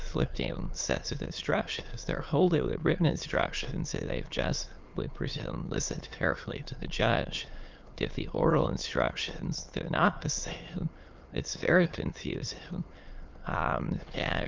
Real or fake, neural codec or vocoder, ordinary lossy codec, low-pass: fake; autoencoder, 22.05 kHz, a latent of 192 numbers a frame, VITS, trained on many speakers; Opus, 32 kbps; 7.2 kHz